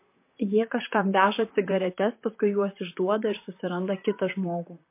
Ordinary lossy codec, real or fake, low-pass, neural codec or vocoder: MP3, 24 kbps; fake; 3.6 kHz; vocoder, 44.1 kHz, 128 mel bands, Pupu-Vocoder